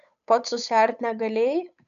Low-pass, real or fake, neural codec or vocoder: 7.2 kHz; fake; codec, 16 kHz, 8 kbps, FunCodec, trained on Chinese and English, 25 frames a second